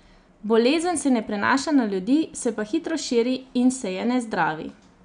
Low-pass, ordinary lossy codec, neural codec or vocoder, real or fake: 9.9 kHz; none; none; real